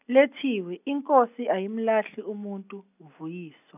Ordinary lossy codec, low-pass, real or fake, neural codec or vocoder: none; 3.6 kHz; real; none